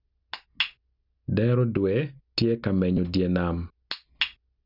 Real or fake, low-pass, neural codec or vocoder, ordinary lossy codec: real; 5.4 kHz; none; none